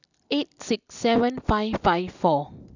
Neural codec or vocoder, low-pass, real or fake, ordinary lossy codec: none; 7.2 kHz; real; none